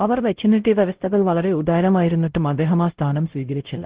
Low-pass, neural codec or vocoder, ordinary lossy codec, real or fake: 3.6 kHz; codec, 16 kHz, 0.5 kbps, X-Codec, WavLM features, trained on Multilingual LibriSpeech; Opus, 16 kbps; fake